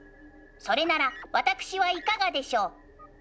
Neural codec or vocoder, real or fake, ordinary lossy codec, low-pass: none; real; none; none